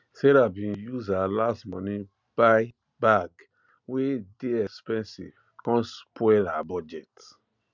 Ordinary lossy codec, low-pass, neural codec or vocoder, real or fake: none; 7.2 kHz; none; real